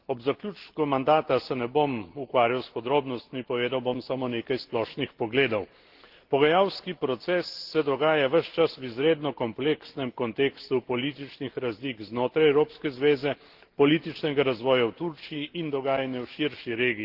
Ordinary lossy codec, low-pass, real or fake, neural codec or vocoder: Opus, 16 kbps; 5.4 kHz; real; none